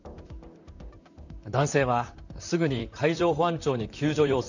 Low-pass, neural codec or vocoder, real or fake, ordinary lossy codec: 7.2 kHz; vocoder, 44.1 kHz, 128 mel bands, Pupu-Vocoder; fake; none